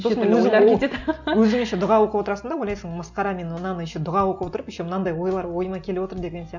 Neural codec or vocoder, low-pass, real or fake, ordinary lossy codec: none; 7.2 kHz; real; none